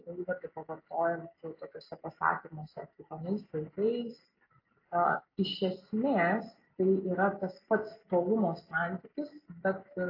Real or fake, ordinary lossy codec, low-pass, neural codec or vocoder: real; AAC, 32 kbps; 5.4 kHz; none